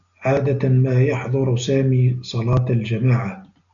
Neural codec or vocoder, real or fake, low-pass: none; real; 7.2 kHz